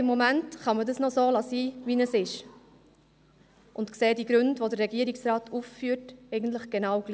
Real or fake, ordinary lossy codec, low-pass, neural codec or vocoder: real; none; none; none